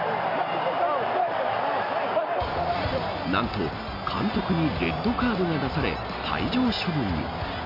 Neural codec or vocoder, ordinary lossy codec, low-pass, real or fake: none; none; 5.4 kHz; real